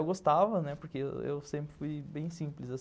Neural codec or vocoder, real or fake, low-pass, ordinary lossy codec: none; real; none; none